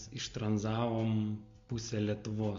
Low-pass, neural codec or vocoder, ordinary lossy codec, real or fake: 7.2 kHz; none; MP3, 48 kbps; real